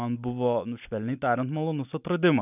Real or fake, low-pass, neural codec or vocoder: real; 3.6 kHz; none